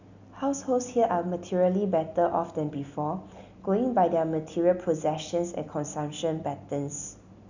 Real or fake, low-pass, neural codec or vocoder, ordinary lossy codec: real; 7.2 kHz; none; none